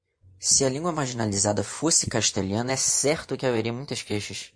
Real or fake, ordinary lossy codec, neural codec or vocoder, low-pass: fake; MP3, 48 kbps; vocoder, 24 kHz, 100 mel bands, Vocos; 9.9 kHz